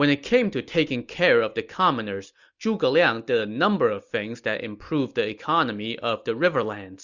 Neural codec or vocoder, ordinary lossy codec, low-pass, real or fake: none; Opus, 64 kbps; 7.2 kHz; real